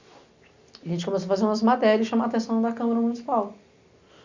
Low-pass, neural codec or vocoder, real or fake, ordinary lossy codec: 7.2 kHz; none; real; Opus, 64 kbps